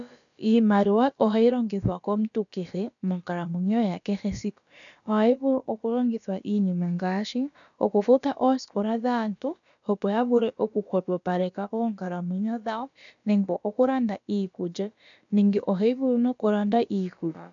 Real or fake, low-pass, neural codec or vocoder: fake; 7.2 kHz; codec, 16 kHz, about 1 kbps, DyCAST, with the encoder's durations